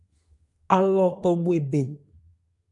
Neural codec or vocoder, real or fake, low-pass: codec, 24 kHz, 1 kbps, SNAC; fake; 10.8 kHz